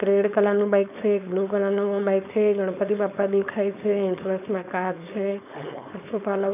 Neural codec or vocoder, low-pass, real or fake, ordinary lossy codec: codec, 16 kHz, 4.8 kbps, FACodec; 3.6 kHz; fake; none